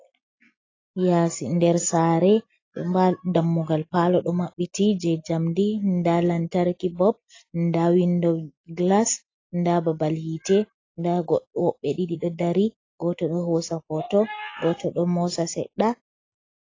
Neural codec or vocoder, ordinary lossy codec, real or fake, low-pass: none; AAC, 32 kbps; real; 7.2 kHz